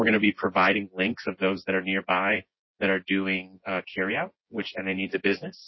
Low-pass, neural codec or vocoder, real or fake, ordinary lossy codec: 7.2 kHz; vocoder, 24 kHz, 100 mel bands, Vocos; fake; MP3, 24 kbps